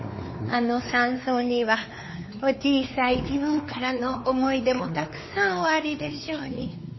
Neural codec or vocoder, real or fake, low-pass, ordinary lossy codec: codec, 16 kHz, 4 kbps, X-Codec, WavLM features, trained on Multilingual LibriSpeech; fake; 7.2 kHz; MP3, 24 kbps